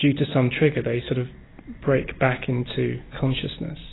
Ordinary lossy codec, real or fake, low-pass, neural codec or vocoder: AAC, 16 kbps; real; 7.2 kHz; none